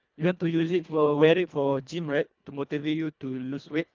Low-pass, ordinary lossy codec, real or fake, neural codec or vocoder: 7.2 kHz; Opus, 24 kbps; fake; codec, 24 kHz, 1.5 kbps, HILCodec